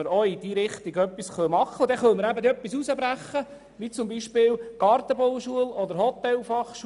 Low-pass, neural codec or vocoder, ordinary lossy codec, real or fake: 10.8 kHz; none; none; real